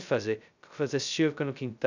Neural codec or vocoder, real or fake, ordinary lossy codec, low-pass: codec, 16 kHz, 0.2 kbps, FocalCodec; fake; none; 7.2 kHz